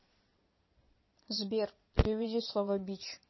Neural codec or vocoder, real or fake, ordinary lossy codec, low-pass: vocoder, 22.05 kHz, 80 mel bands, Vocos; fake; MP3, 24 kbps; 7.2 kHz